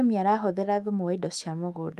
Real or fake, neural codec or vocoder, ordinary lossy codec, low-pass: fake; autoencoder, 48 kHz, 128 numbers a frame, DAC-VAE, trained on Japanese speech; Opus, 32 kbps; 14.4 kHz